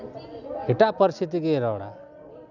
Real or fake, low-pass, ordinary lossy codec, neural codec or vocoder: real; 7.2 kHz; none; none